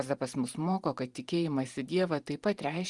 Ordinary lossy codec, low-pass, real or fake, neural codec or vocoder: Opus, 24 kbps; 10.8 kHz; fake; vocoder, 44.1 kHz, 128 mel bands every 512 samples, BigVGAN v2